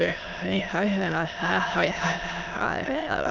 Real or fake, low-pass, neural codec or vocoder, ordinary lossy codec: fake; 7.2 kHz; autoencoder, 22.05 kHz, a latent of 192 numbers a frame, VITS, trained on many speakers; none